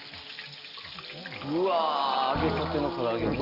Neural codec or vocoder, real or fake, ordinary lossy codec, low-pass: none; real; Opus, 32 kbps; 5.4 kHz